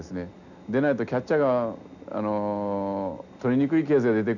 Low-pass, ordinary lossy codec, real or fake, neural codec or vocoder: 7.2 kHz; none; real; none